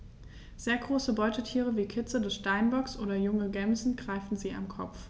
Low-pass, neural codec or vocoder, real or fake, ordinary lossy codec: none; none; real; none